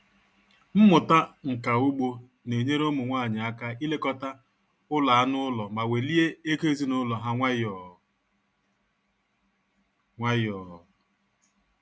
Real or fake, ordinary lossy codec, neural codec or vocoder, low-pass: real; none; none; none